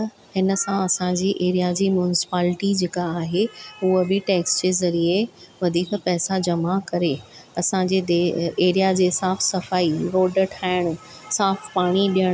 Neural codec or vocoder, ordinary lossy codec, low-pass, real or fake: none; none; none; real